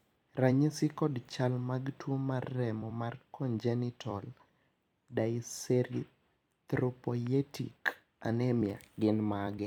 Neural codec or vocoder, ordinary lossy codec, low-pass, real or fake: vocoder, 44.1 kHz, 128 mel bands every 256 samples, BigVGAN v2; none; 19.8 kHz; fake